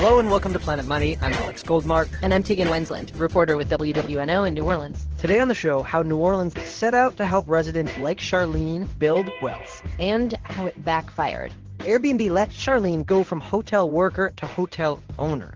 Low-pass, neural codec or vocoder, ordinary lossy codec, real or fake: 7.2 kHz; codec, 16 kHz in and 24 kHz out, 1 kbps, XY-Tokenizer; Opus, 16 kbps; fake